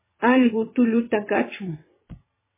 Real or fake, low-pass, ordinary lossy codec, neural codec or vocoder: fake; 3.6 kHz; MP3, 16 kbps; vocoder, 22.05 kHz, 80 mel bands, WaveNeXt